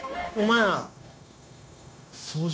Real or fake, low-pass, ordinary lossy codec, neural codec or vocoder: fake; none; none; codec, 16 kHz, 0.9 kbps, LongCat-Audio-Codec